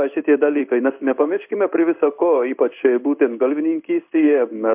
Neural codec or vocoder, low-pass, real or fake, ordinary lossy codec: codec, 16 kHz in and 24 kHz out, 1 kbps, XY-Tokenizer; 3.6 kHz; fake; MP3, 32 kbps